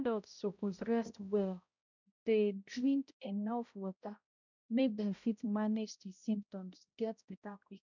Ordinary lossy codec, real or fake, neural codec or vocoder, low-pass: none; fake; codec, 16 kHz, 0.5 kbps, X-Codec, HuBERT features, trained on balanced general audio; 7.2 kHz